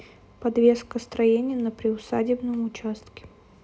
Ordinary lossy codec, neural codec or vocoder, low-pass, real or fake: none; none; none; real